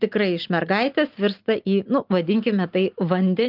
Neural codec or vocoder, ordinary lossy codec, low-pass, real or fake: none; Opus, 32 kbps; 5.4 kHz; real